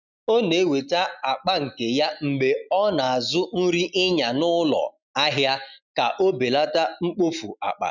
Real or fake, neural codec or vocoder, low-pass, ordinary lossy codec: real; none; 7.2 kHz; none